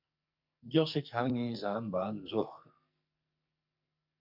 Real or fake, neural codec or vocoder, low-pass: fake; codec, 44.1 kHz, 2.6 kbps, SNAC; 5.4 kHz